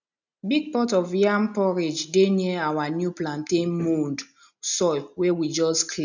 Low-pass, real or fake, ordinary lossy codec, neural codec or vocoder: 7.2 kHz; real; none; none